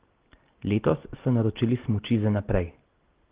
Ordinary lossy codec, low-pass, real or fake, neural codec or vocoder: Opus, 16 kbps; 3.6 kHz; real; none